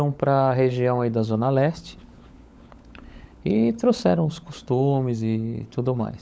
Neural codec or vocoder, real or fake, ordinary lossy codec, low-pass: codec, 16 kHz, 16 kbps, FunCodec, trained on LibriTTS, 50 frames a second; fake; none; none